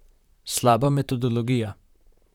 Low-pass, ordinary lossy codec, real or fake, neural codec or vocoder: 19.8 kHz; none; fake; vocoder, 44.1 kHz, 128 mel bands, Pupu-Vocoder